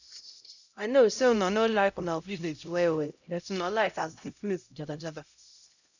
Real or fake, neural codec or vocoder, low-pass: fake; codec, 16 kHz, 0.5 kbps, X-Codec, HuBERT features, trained on LibriSpeech; 7.2 kHz